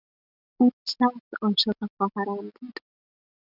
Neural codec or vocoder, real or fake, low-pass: none; real; 5.4 kHz